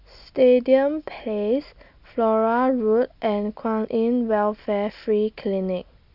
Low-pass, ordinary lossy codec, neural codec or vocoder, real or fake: 5.4 kHz; none; none; real